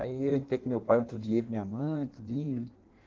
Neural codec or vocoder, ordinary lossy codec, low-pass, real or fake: codec, 16 kHz in and 24 kHz out, 1.1 kbps, FireRedTTS-2 codec; Opus, 16 kbps; 7.2 kHz; fake